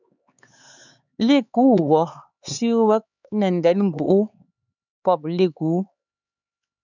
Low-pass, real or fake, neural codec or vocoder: 7.2 kHz; fake; codec, 16 kHz, 4 kbps, X-Codec, HuBERT features, trained on LibriSpeech